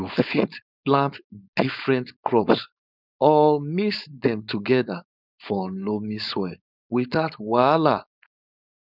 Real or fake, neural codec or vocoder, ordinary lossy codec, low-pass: fake; codec, 16 kHz, 4.8 kbps, FACodec; none; 5.4 kHz